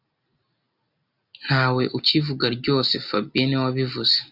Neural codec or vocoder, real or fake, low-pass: none; real; 5.4 kHz